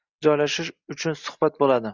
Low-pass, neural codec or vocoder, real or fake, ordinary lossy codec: 7.2 kHz; none; real; Opus, 64 kbps